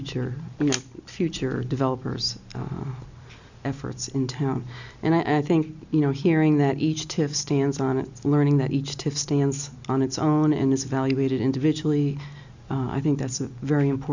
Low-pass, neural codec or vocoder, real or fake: 7.2 kHz; none; real